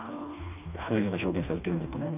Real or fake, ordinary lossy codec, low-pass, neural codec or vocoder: fake; none; 3.6 kHz; codec, 16 kHz, 2 kbps, FreqCodec, smaller model